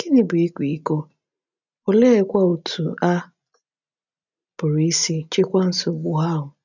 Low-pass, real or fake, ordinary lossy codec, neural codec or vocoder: 7.2 kHz; real; none; none